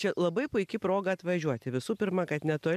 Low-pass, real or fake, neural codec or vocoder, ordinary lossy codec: 14.4 kHz; real; none; MP3, 96 kbps